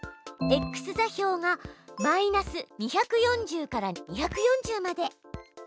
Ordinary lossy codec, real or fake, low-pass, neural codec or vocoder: none; real; none; none